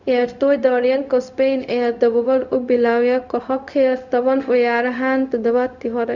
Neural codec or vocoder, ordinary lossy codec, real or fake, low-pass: codec, 16 kHz, 0.4 kbps, LongCat-Audio-Codec; none; fake; 7.2 kHz